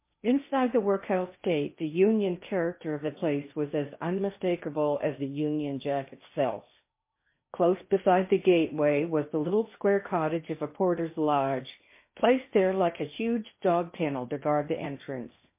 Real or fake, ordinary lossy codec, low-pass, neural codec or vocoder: fake; MP3, 24 kbps; 3.6 kHz; codec, 16 kHz in and 24 kHz out, 0.8 kbps, FocalCodec, streaming, 65536 codes